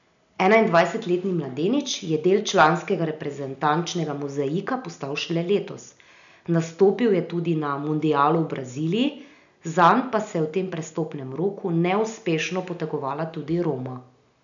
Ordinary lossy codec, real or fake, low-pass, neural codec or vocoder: none; real; 7.2 kHz; none